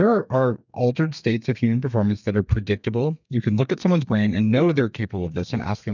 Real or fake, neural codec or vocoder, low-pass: fake; codec, 32 kHz, 1.9 kbps, SNAC; 7.2 kHz